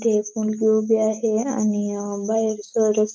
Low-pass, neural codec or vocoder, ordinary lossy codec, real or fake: none; none; none; real